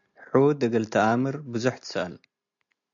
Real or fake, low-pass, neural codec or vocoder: real; 7.2 kHz; none